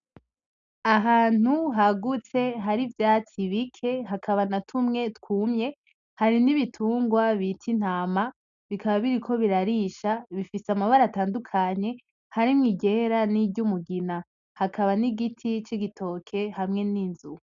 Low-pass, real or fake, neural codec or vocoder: 7.2 kHz; real; none